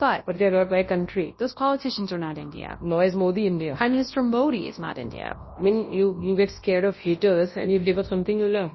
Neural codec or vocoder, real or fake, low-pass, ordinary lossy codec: codec, 24 kHz, 0.9 kbps, WavTokenizer, large speech release; fake; 7.2 kHz; MP3, 24 kbps